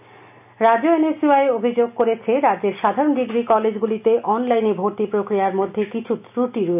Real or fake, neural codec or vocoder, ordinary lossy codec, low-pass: real; none; none; 3.6 kHz